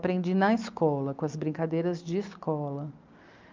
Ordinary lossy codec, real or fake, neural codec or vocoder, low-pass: Opus, 24 kbps; real; none; 7.2 kHz